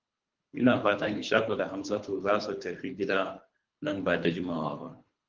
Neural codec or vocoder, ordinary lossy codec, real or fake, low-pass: codec, 24 kHz, 3 kbps, HILCodec; Opus, 24 kbps; fake; 7.2 kHz